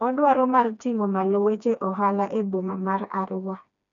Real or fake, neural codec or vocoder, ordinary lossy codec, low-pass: fake; codec, 16 kHz, 2 kbps, FreqCodec, smaller model; none; 7.2 kHz